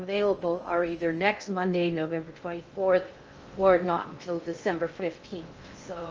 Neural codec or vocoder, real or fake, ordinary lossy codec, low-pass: codec, 16 kHz in and 24 kHz out, 0.6 kbps, FocalCodec, streaming, 2048 codes; fake; Opus, 24 kbps; 7.2 kHz